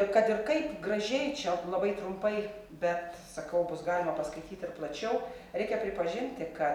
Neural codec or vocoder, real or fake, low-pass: none; real; 19.8 kHz